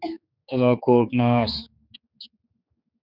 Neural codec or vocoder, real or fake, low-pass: codec, 16 kHz, 4 kbps, X-Codec, HuBERT features, trained on general audio; fake; 5.4 kHz